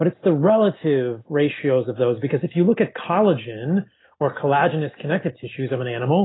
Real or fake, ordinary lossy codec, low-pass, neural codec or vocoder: real; AAC, 16 kbps; 7.2 kHz; none